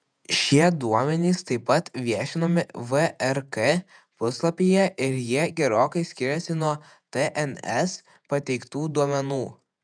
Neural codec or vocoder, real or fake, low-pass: vocoder, 48 kHz, 128 mel bands, Vocos; fake; 9.9 kHz